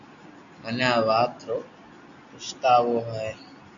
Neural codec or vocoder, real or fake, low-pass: none; real; 7.2 kHz